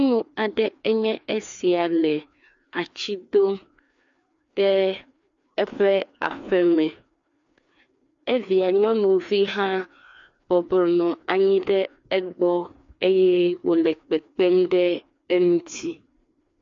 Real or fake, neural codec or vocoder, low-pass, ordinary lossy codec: fake; codec, 16 kHz, 2 kbps, FreqCodec, larger model; 7.2 kHz; MP3, 48 kbps